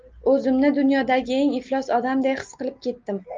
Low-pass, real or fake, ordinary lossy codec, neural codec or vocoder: 7.2 kHz; real; Opus, 24 kbps; none